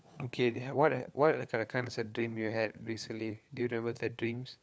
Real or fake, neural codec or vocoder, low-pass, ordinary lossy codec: fake; codec, 16 kHz, 4 kbps, FunCodec, trained on LibriTTS, 50 frames a second; none; none